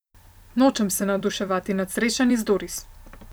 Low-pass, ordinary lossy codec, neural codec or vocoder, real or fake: none; none; vocoder, 44.1 kHz, 128 mel bands every 256 samples, BigVGAN v2; fake